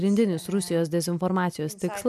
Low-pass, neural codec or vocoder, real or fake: 14.4 kHz; none; real